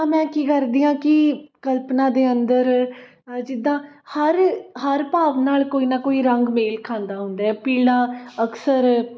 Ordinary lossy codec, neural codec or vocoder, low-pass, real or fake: none; none; none; real